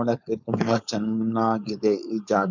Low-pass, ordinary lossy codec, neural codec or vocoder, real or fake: 7.2 kHz; none; codec, 16 kHz, 4.8 kbps, FACodec; fake